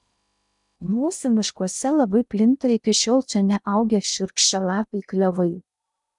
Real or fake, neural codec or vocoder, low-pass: fake; codec, 16 kHz in and 24 kHz out, 0.6 kbps, FocalCodec, streaming, 2048 codes; 10.8 kHz